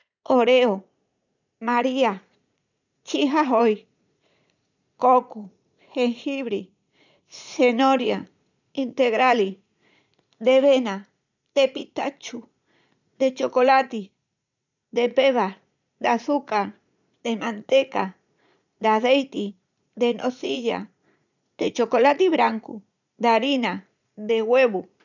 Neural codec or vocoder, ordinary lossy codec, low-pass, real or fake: vocoder, 44.1 kHz, 80 mel bands, Vocos; none; 7.2 kHz; fake